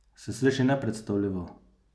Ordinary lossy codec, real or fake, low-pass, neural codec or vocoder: none; real; none; none